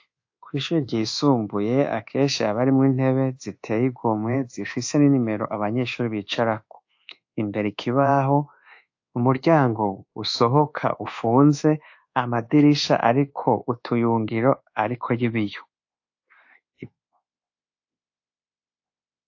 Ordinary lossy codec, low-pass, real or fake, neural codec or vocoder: AAC, 48 kbps; 7.2 kHz; fake; codec, 24 kHz, 1.2 kbps, DualCodec